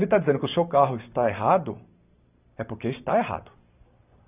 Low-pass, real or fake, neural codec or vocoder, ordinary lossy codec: 3.6 kHz; fake; vocoder, 44.1 kHz, 128 mel bands every 512 samples, BigVGAN v2; none